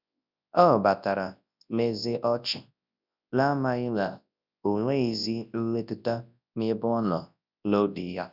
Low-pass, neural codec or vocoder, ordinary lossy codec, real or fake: 5.4 kHz; codec, 24 kHz, 0.9 kbps, WavTokenizer, large speech release; none; fake